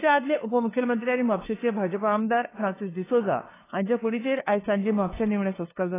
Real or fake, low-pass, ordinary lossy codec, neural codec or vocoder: fake; 3.6 kHz; AAC, 16 kbps; codec, 16 kHz, 2 kbps, FunCodec, trained on LibriTTS, 25 frames a second